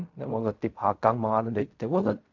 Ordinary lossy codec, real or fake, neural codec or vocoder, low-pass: AAC, 48 kbps; fake; codec, 16 kHz in and 24 kHz out, 0.4 kbps, LongCat-Audio-Codec, fine tuned four codebook decoder; 7.2 kHz